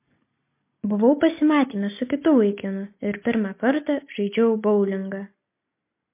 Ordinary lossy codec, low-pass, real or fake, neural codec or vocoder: MP3, 24 kbps; 3.6 kHz; real; none